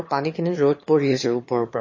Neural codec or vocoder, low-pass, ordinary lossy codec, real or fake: autoencoder, 22.05 kHz, a latent of 192 numbers a frame, VITS, trained on one speaker; 7.2 kHz; MP3, 32 kbps; fake